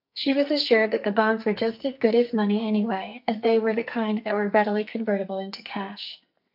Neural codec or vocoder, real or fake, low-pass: codec, 44.1 kHz, 2.6 kbps, SNAC; fake; 5.4 kHz